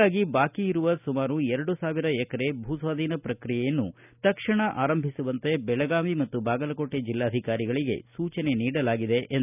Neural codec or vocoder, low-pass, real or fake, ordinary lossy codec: none; 3.6 kHz; real; none